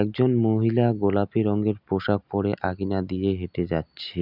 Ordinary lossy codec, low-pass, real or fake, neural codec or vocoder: none; 5.4 kHz; real; none